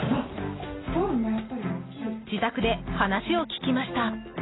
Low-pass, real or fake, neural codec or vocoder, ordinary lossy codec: 7.2 kHz; real; none; AAC, 16 kbps